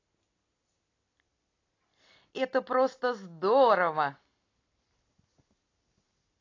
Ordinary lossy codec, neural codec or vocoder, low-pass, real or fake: AAC, 32 kbps; none; 7.2 kHz; real